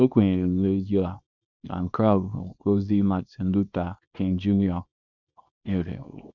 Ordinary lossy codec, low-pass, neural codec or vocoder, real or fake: none; 7.2 kHz; codec, 24 kHz, 0.9 kbps, WavTokenizer, small release; fake